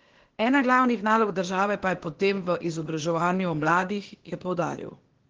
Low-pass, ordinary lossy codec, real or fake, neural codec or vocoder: 7.2 kHz; Opus, 16 kbps; fake; codec, 16 kHz, 0.8 kbps, ZipCodec